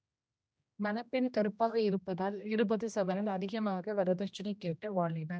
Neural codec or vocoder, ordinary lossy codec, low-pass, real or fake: codec, 16 kHz, 1 kbps, X-Codec, HuBERT features, trained on general audio; none; none; fake